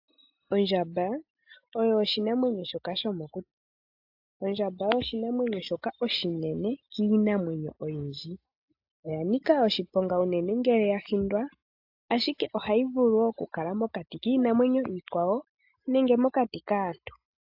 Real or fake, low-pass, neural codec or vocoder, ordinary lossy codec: real; 5.4 kHz; none; AAC, 48 kbps